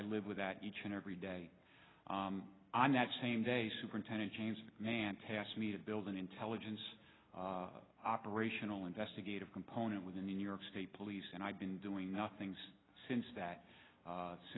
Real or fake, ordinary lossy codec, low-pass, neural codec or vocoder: real; AAC, 16 kbps; 7.2 kHz; none